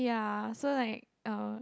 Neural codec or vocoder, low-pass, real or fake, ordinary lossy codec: none; none; real; none